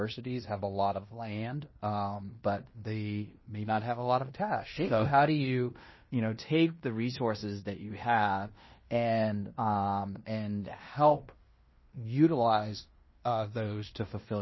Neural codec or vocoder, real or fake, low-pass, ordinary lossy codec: codec, 16 kHz in and 24 kHz out, 0.9 kbps, LongCat-Audio-Codec, fine tuned four codebook decoder; fake; 7.2 kHz; MP3, 24 kbps